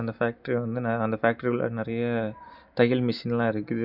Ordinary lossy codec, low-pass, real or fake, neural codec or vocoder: none; 5.4 kHz; real; none